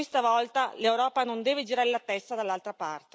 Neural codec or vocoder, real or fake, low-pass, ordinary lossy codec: none; real; none; none